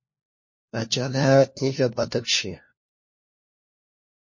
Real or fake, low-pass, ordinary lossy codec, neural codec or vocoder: fake; 7.2 kHz; MP3, 32 kbps; codec, 16 kHz, 1 kbps, FunCodec, trained on LibriTTS, 50 frames a second